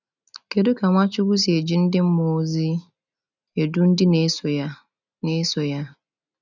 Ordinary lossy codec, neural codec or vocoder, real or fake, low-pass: none; none; real; 7.2 kHz